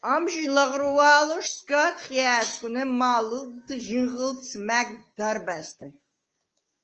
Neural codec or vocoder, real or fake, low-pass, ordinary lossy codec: codec, 16 kHz, 6 kbps, DAC; fake; 7.2 kHz; Opus, 24 kbps